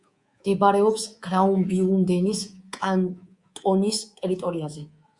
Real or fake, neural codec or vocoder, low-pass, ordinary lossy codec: fake; codec, 24 kHz, 3.1 kbps, DualCodec; 10.8 kHz; Opus, 64 kbps